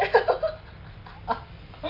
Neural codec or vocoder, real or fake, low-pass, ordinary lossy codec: none; real; 5.4 kHz; Opus, 32 kbps